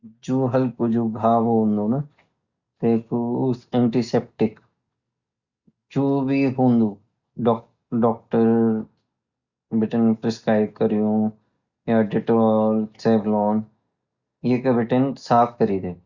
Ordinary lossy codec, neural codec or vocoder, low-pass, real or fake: Opus, 64 kbps; none; 7.2 kHz; real